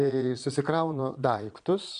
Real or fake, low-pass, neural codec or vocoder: fake; 9.9 kHz; vocoder, 22.05 kHz, 80 mel bands, Vocos